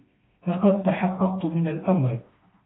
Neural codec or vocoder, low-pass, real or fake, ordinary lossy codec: codec, 16 kHz, 2 kbps, FreqCodec, smaller model; 7.2 kHz; fake; AAC, 16 kbps